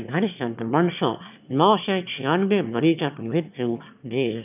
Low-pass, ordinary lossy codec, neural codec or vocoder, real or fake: 3.6 kHz; none; autoencoder, 22.05 kHz, a latent of 192 numbers a frame, VITS, trained on one speaker; fake